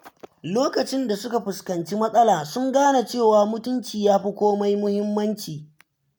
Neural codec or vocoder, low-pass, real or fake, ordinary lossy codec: none; none; real; none